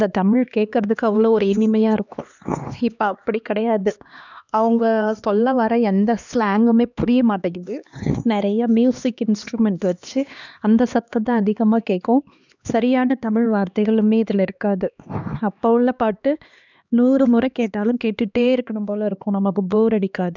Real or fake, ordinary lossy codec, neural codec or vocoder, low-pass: fake; none; codec, 16 kHz, 2 kbps, X-Codec, HuBERT features, trained on LibriSpeech; 7.2 kHz